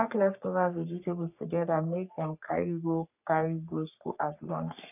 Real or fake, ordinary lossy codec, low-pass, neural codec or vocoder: fake; none; 3.6 kHz; codec, 44.1 kHz, 3.4 kbps, Pupu-Codec